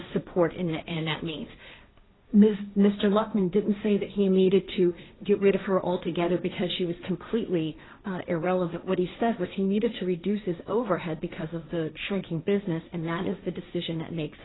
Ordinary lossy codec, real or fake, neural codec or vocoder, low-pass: AAC, 16 kbps; fake; codec, 16 kHz, 1.1 kbps, Voila-Tokenizer; 7.2 kHz